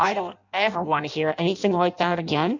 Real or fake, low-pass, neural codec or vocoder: fake; 7.2 kHz; codec, 16 kHz in and 24 kHz out, 0.6 kbps, FireRedTTS-2 codec